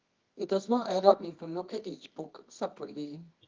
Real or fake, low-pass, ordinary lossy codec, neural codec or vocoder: fake; 7.2 kHz; Opus, 24 kbps; codec, 24 kHz, 0.9 kbps, WavTokenizer, medium music audio release